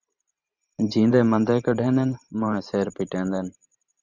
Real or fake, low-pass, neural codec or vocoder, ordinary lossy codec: fake; 7.2 kHz; vocoder, 44.1 kHz, 128 mel bands every 512 samples, BigVGAN v2; Opus, 64 kbps